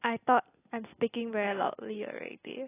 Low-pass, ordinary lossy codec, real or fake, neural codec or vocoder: 3.6 kHz; AAC, 16 kbps; real; none